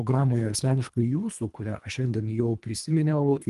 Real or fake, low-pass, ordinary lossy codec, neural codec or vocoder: fake; 10.8 kHz; Opus, 32 kbps; codec, 24 kHz, 1.5 kbps, HILCodec